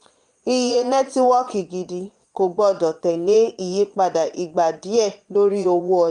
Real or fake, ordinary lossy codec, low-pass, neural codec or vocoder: fake; Opus, 32 kbps; 9.9 kHz; vocoder, 22.05 kHz, 80 mel bands, Vocos